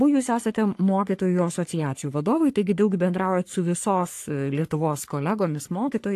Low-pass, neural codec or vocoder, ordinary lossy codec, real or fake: 14.4 kHz; codec, 44.1 kHz, 3.4 kbps, Pupu-Codec; AAC, 64 kbps; fake